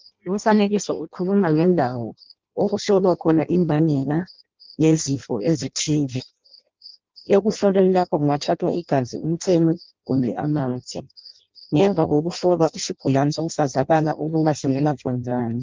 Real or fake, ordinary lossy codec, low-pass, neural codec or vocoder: fake; Opus, 32 kbps; 7.2 kHz; codec, 16 kHz in and 24 kHz out, 0.6 kbps, FireRedTTS-2 codec